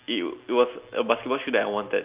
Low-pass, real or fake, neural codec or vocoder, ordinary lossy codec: 3.6 kHz; real; none; Opus, 32 kbps